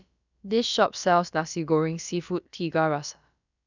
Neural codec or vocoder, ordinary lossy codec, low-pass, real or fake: codec, 16 kHz, about 1 kbps, DyCAST, with the encoder's durations; none; 7.2 kHz; fake